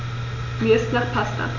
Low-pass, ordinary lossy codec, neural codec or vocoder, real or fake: 7.2 kHz; none; none; real